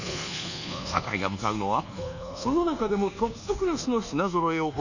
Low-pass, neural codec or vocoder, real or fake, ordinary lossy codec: 7.2 kHz; codec, 24 kHz, 1.2 kbps, DualCodec; fake; none